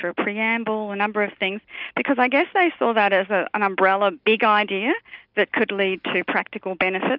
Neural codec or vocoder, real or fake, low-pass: none; real; 5.4 kHz